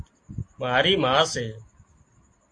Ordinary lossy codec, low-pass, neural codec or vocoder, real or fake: AAC, 48 kbps; 9.9 kHz; none; real